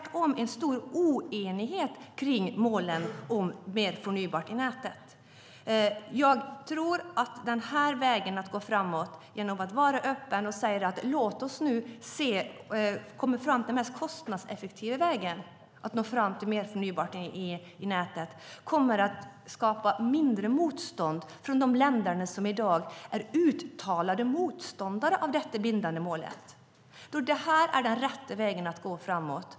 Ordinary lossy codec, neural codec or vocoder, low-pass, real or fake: none; none; none; real